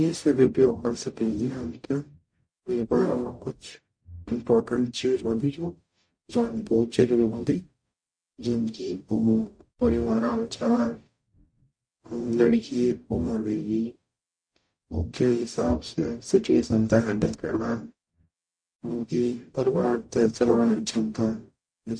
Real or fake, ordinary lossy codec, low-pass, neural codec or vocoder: fake; MP3, 48 kbps; 9.9 kHz; codec, 44.1 kHz, 0.9 kbps, DAC